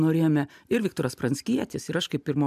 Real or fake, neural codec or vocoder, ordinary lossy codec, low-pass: real; none; MP3, 96 kbps; 14.4 kHz